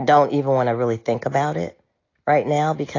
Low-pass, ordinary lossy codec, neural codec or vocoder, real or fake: 7.2 kHz; AAC, 32 kbps; none; real